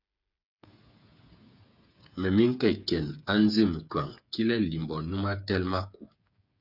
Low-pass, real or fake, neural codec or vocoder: 5.4 kHz; fake; codec, 16 kHz, 8 kbps, FreqCodec, smaller model